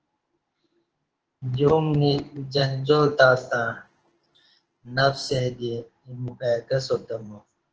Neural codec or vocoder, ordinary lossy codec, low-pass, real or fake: codec, 16 kHz in and 24 kHz out, 1 kbps, XY-Tokenizer; Opus, 16 kbps; 7.2 kHz; fake